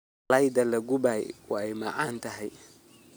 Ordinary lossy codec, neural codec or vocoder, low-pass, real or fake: none; none; none; real